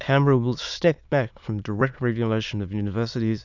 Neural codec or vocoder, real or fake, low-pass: autoencoder, 22.05 kHz, a latent of 192 numbers a frame, VITS, trained on many speakers; fake; 7.2 kHz